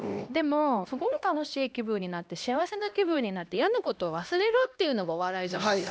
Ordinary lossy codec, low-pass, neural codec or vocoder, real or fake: none; none; codec, 16 kHz, 1 kbps, X-Codec, HuBERT features, trained on LibriSpeech; fake